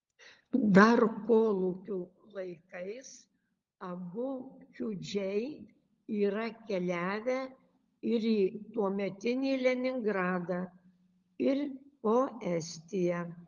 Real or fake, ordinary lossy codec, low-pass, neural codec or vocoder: fake; Opus, 32 kbps; 7.2 kHz; codec, 16 kHz, 16 kbps, FunCodec, trained on LibriTTS, 50 frames a second